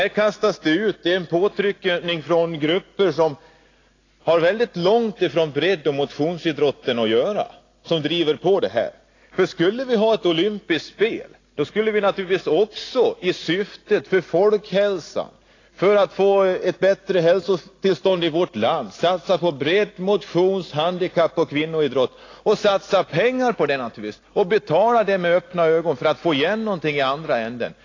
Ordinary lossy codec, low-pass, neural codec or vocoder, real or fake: AAC, 32 kbps; 7.2 kHz; none; real